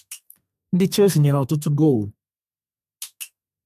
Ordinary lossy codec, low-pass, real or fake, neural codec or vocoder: MP3, 96 kbps; 14.4 kHz; fake; codec, 32 kHz, 1.9 kbps, SNAC